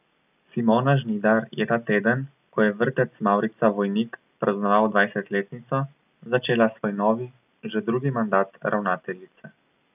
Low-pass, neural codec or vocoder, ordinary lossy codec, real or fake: 3.6 kHz; none; none; real